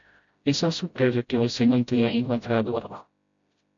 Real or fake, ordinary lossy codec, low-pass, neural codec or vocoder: fake; MP3, 48 kbps; 7.2 kHz; codec, 16 kHz, 0.5 kbps, FreqCodec, smaller model